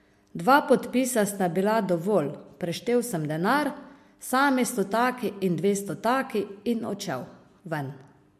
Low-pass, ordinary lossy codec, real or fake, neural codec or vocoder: 14.4 kHz; MP3, 64 kbps; real; none